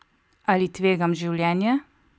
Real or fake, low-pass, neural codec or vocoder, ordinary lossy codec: real; none; none; none